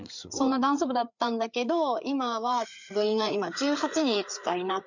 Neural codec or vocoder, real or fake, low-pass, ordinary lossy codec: codec, 16 kHz, 4 kbps, FreqCodec, larger model; fake; 7.2 kHz; none